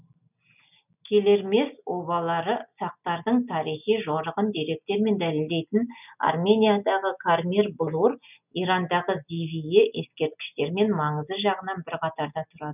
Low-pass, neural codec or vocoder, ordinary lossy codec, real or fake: 3.6 kHz; none; none; real